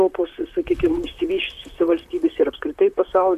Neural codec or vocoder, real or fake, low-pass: vocoder, 44.1 kHz, 128 mel bands, Pupu-Vocoder; fake; 14.4 kHz